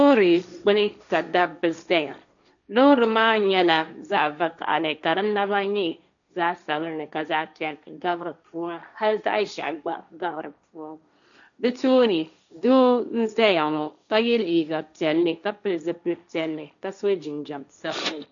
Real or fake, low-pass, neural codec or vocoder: fake; 7.2 kHz; codec, 16 kHz, 1.1 kbps, Voila-Tokenizer